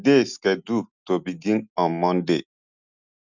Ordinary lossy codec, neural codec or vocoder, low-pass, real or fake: none; none; 7.2 kHz; real